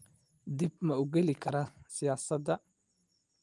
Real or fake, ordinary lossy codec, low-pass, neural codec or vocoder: real; Opus, 32 kbps; 10.8 kHz; none